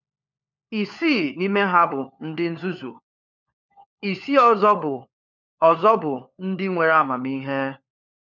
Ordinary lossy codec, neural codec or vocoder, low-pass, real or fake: none; codec, 16 kHz, 4 kbps, FunCodec, trained on LibriTTS, 50 frames a second; 7.2 kHz; fake